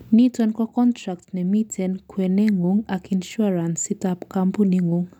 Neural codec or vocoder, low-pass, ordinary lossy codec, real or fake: none; 19.8 kHz; none; real